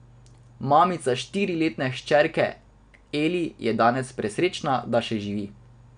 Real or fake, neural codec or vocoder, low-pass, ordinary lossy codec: real; none; 9.9 kHz; none